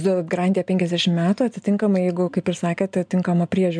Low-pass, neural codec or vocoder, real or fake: 9.9 kHz; none; real